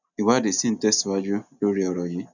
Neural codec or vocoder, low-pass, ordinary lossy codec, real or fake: none; 7.2 kHz; none; real